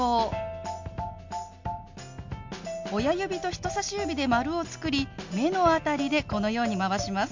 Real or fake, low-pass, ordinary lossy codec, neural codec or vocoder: real; 7.2 kHz; MP3, 48 kbps; none